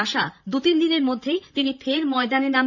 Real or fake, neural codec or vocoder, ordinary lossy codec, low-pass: fake; vocoder, 44.1 kHz, 128 mel bands, Pupu-Vocoder; none; 7.2 kHz